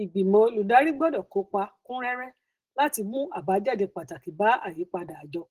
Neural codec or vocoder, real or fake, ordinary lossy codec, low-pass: none; real; Opus, 16 kbps; 14.4 kHz